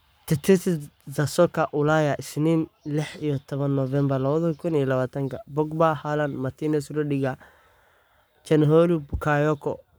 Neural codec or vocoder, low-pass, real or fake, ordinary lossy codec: codec, 44.1 kHz, 7.8 kbps, Pupu-Codec; none; fake; none